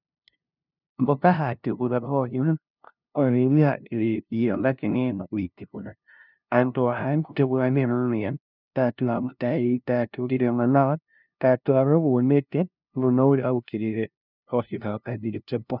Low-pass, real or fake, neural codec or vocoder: 5.4 kHz; fake; codec, 16 kHz, 0.5 kbps, FunCodec, trained on LibriTTS, 25 frames a second